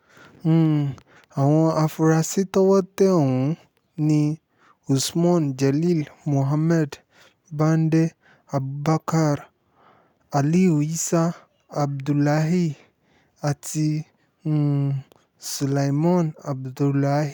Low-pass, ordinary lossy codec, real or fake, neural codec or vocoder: 19.8 kHz; none; real; none